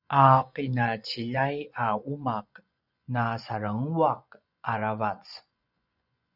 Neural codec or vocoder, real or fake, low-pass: vocoder, 24 kHz, 100 mel bands, Vocos; fake; 5.4 kHz